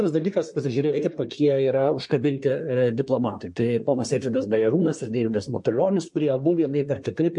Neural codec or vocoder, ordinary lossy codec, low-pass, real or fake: codec, 24 kHz, 1 kbps, SNAC; MP3, 48 kbps; 10.8 kHz; fake